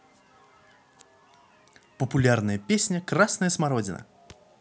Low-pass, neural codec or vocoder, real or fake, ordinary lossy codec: none; none; real; none